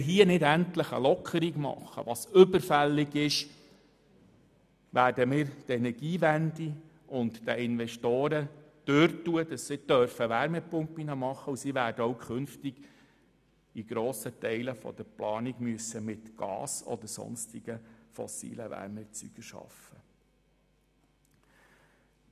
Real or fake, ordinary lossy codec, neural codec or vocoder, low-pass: real; none; none; 14.4 kHz